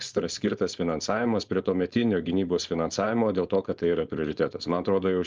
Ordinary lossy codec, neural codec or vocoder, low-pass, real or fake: Opus, 32 kbps; codec, 16 kHz, 4.8 kbps, FACodec; 7.2 kHz; fake